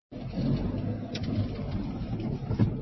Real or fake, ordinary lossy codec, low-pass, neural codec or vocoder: real; MP3, 24 kbps; 7.2 kHz; none